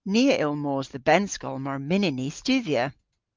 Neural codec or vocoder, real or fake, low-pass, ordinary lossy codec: none; real; 7.2 kHz; Opus, 24 kbps